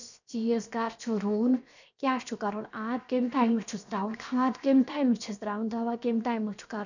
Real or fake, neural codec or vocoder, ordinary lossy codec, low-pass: fake; codec, 16 kHz, 0.7 kbps, FocalCodec; none; 7.2 kHz